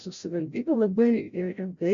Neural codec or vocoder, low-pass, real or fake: codec, 16 kHz, 0.5 kbps, FreqCodec, larger model; 7.2 kHz; fake